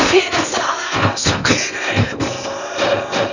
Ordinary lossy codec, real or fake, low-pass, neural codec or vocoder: none; fake; 7.2 kHz; codec, 16 kHz in and 24 kHz out, 0.8 kbps, FocalCodec, streaming, 65536 codes